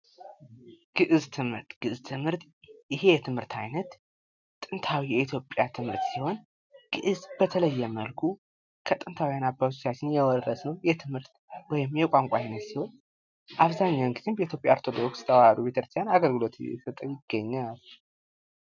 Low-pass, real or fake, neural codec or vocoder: 7.2 kHz; real; none